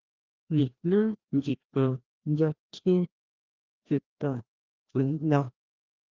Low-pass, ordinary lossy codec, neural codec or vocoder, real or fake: 7.2 kHz; Opus, 32 kbps; codec, 16 kHz, 1 kbps, FreqCodec, larger model; fake